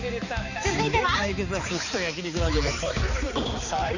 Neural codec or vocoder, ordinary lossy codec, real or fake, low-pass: codec, 16 kHz, 4 kbps, X-Codec, HuBERT features, trained on balanced general audio; none; fake; 7.2 kHz